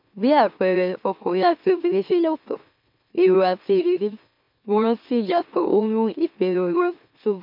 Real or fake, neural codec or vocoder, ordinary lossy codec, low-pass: fake; autoencoder, 44.1 kHz, a latent of 192 numbers a frame, MeloTTS; MP3, 48 kbps; 5.4 kHz